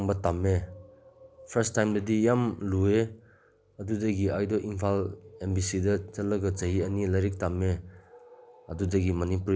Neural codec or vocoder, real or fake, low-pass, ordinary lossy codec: none; real; none; none